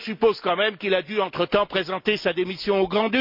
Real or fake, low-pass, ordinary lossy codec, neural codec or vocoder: real; 5.4 kHz; MP3, 48 kbps; none